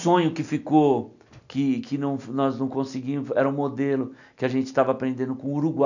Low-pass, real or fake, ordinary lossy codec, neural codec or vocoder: 7.2 kHz; real; none; none